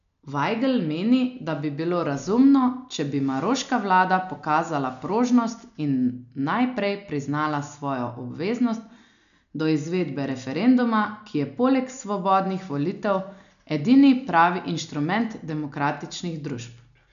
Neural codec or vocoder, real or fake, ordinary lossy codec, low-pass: none; real; none; 7.2 kHz